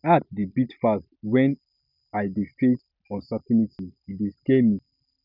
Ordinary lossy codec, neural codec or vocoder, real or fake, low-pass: none; none; real; 5.4 kHz